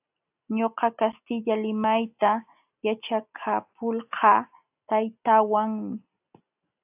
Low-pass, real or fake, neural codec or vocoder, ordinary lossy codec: 3.6 kHz; real; none; AAC, 32 kbps